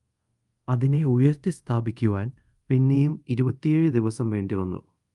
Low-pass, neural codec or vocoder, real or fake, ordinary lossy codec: 10.8 kHz; codec, 24 kHz, 0.5 kbps, DualCodec; fake; Opus, 32 kbps